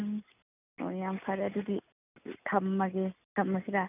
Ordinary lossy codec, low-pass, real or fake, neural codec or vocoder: none; 3.6 kHz; real; none